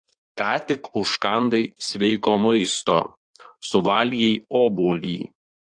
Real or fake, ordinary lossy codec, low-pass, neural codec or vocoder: fake; AAC, 64 kbps; 9.9 kHz; codec, 16 kHz in and 24 kHz out, 1.1 kbps, FireRedTTS-2 codec